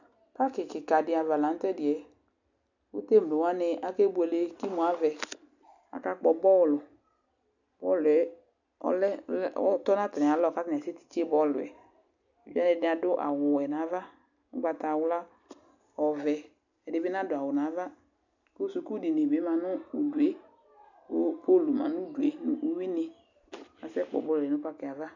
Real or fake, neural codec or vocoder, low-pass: real; none; 7.2 kHz